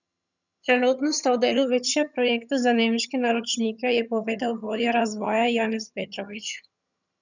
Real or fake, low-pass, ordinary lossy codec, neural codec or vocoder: fake; 7.2 kHz; none; vocoder, 22.05 kHz, 80 mel bands, HiFi-GAN